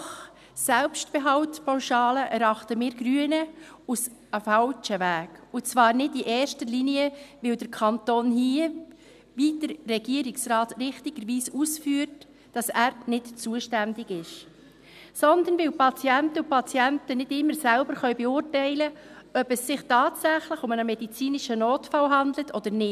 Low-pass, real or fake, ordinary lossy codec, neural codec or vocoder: 14.4 kHz; real; none; none